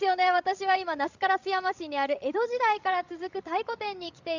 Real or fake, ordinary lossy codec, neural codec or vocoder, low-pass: fake; none; codec, 16 kHz, 16 kbps, FreqCodec, larger model; 7.2 kHz